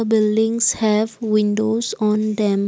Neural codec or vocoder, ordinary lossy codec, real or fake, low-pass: none; none; real; none